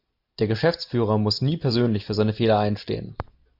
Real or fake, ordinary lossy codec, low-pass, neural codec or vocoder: real; MP3, 48 kbps; 5.4 kHz; none